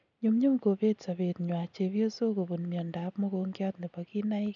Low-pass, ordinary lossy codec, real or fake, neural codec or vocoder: 7.2 kHz; none; real; none